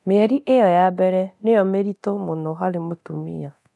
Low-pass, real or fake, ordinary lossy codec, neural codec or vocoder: none; fake; none; codec, 24 kHz, 0.9 kbps, DualCodec